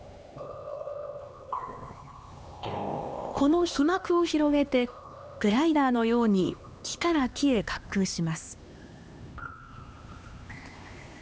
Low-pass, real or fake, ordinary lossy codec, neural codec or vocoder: none; fake; none; codec, 16 kHz, 2 kbps, X-Codec, HuBERT features, trained on LibriSpeech